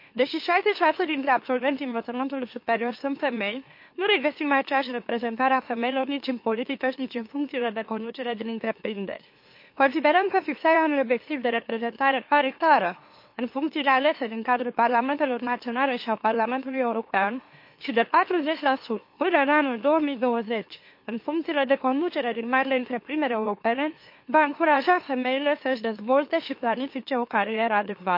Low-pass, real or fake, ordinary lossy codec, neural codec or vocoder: 5.4 kHz; fake; MP3, 32 kbps; autoencoder, 44.1 kHz, a latent of 192 numbers a frame, MeloTTS